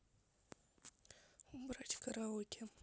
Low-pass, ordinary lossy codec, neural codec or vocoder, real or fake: none; none; none; real